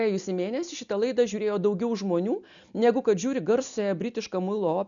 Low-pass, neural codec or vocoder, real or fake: 7.2 kHz; none; real